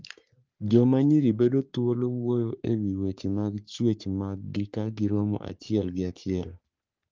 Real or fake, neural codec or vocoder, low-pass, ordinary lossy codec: fake; codec, 44.1 kHz, 3.4 kbps, Pupu-Codec; 7.2 kHz; Opus, 32 kbps